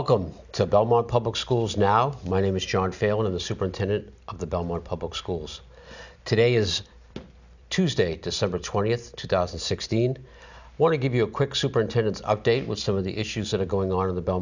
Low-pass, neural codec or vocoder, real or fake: 7.2 kHz; none; real